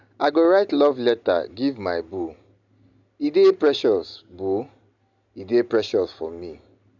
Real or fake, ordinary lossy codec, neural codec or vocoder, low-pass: real; none; none; 7.2 kHz